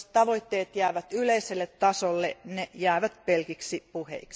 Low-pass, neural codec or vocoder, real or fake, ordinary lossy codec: none; none; real; none